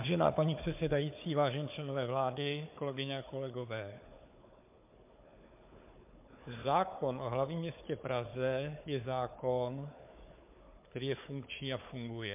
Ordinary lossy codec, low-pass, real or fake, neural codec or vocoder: MP3, 32 kbps; 3.6 kHz; fake; codec, 16 kHz, 4 kbps, FunCodec, trained on Chinese and English, 50 frames a second